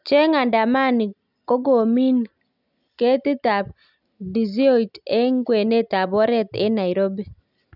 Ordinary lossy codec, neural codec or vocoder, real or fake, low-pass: none; none; real; 5.4 kHz